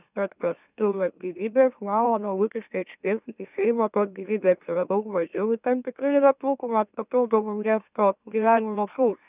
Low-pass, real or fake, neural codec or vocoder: 3.6 kHz; fake; autoencoder, 44.1 kHz, a latent of 192 numbers a frame, MeloTTS